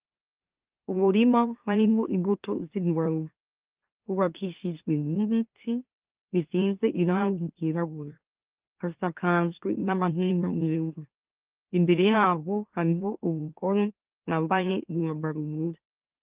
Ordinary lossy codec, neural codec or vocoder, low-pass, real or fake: Opus, 32 kbps; autoencoder, 44.1 kHz, a latent of 192 numbers a frame, MeloTTS; 3.6 kHz; fake